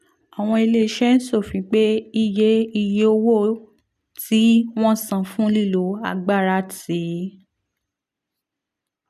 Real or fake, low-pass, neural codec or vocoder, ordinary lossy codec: real; 14.4 kHz; none; none